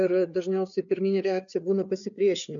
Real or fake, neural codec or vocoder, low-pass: fake; codec, 16 kHz, 4 kbps, FreqCodec, larger model; 7.2 kHz